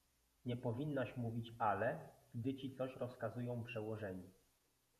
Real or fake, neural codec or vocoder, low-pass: fake; vocoder, 48 kHz, 128 mel bands, Vocos; 14.4 kHz